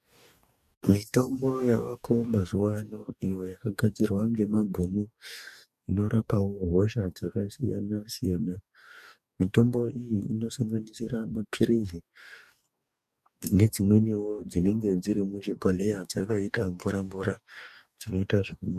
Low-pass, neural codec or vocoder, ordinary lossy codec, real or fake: 14.4 kHz; codec, 44.1 kHz, 2.6 kbps, DAC; MP3, 96 kbps; fake